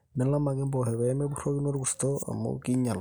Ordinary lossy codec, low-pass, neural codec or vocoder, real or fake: none; none; none; real